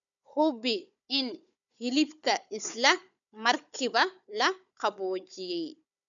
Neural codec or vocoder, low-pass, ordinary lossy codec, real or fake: codec, 16 kHz, 4 kbps, FunCodec, trained on Chinese and English, 50 frames a second; 7.2 kHz; none; fake